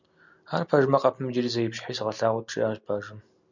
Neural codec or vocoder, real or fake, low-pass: none; real; 7.2 kHz